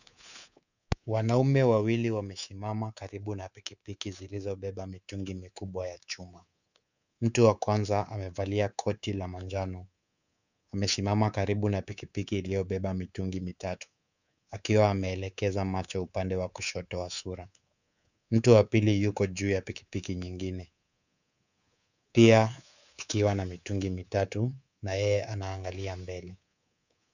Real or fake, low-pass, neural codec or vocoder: fake; 7.2 kHz; codec, 24 kHz, 3.1 kbps, DualCodec